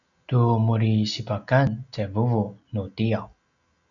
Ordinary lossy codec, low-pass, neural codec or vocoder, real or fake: MP3, 96 kbps; 7.2 kHz; none; real